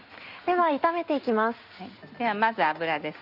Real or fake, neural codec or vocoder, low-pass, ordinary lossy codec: real; none; 5.4 kHz; none